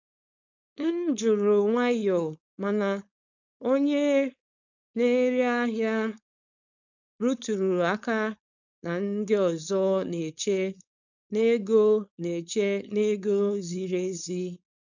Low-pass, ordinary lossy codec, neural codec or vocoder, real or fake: 7.2 kHz; none; codec, 16 kHz, 4.8 kbps, FACodec; fake